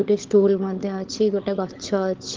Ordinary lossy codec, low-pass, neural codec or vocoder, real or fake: Opus, 16 kbps; 7.2 kHz; codec, 16 kHz, 16 kbps, FunCodec, trained on LibriTTS, 50 frames a second; fake